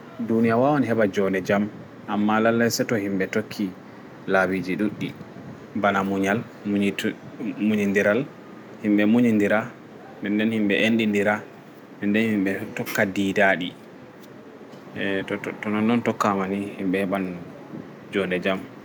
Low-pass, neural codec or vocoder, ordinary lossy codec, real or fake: none; none; none; real